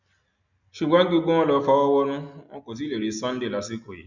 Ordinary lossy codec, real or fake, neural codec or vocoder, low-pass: none; real; none; 7.2 kHz